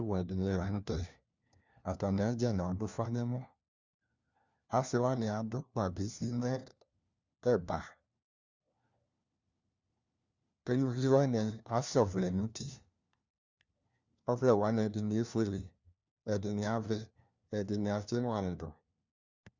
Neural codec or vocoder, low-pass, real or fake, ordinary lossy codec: codec, 16 kHz, 1 kbps, FunCodec, trained on LibriTTS, 50 frames a second; 7.2 kHz; fake; Opus, 64 kbps